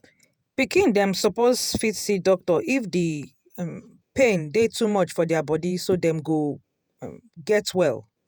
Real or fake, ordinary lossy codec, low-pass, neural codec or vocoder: fake; none; none; vocoder, 48 kHz, 128 mel bands, Vocos